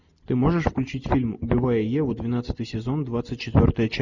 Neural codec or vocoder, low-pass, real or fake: none; 7.2 kHz; real